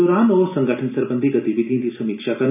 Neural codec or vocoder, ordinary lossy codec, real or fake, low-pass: none; none; real; 3.6 kHz